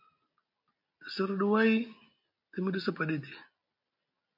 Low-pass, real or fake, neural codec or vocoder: 5.4 kHz; real; none